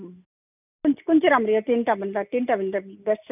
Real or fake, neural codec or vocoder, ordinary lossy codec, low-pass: real; none; none; 3.6 kHz